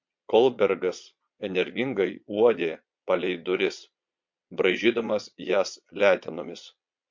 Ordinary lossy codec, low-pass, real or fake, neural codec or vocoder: MP3, 48 kbps; 7.2 kHz; fake; vocoder, 22.05 kHz, 80 mel bands, Vocos